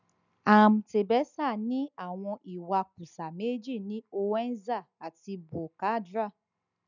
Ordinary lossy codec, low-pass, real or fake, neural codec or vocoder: none; 7.2 kHz; real; none